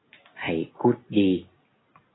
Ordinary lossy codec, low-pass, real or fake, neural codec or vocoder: AAC, 16 kbps; 7.2 kHz; real; none